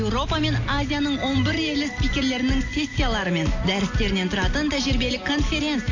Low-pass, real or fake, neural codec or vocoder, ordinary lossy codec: 7.2 kHz; real; none; AAC, 48 kbps